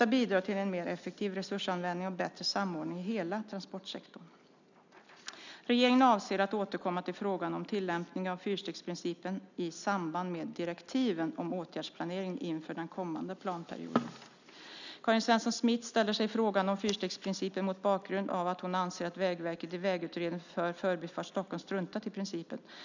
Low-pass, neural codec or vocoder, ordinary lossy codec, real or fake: 7.2 kHz; none; none; real